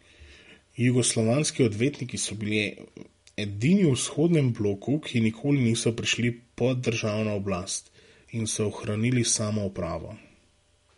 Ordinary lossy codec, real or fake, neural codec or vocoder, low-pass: MP3, 48 kbps; real; none; 10.8 kHz